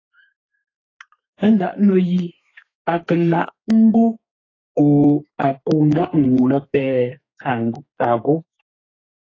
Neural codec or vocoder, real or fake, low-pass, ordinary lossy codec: codec, 32 kHz, 1.9 kbps, SNAC; fake; 7.2 kHz; AAC, 32 kbps